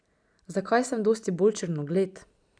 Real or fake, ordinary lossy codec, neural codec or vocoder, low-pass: real; none; none; 9.9 kHz